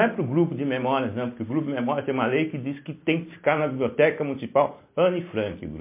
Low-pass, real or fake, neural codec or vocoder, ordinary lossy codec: 3.6 kHz; real; none; MP3, 24 kbps